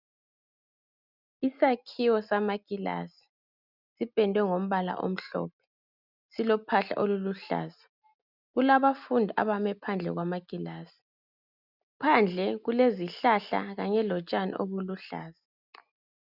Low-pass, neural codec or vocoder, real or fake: 5.4 kHz; none; real